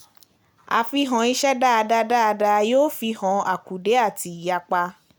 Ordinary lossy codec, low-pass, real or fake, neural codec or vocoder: none; none; real; none